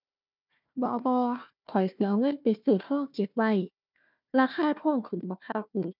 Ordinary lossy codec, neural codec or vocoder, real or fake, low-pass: none; codec, 16 kHz, 1 kbps, FunCodec, trained on Chinese and English, 50 frames a second; fake; 5.4 kHz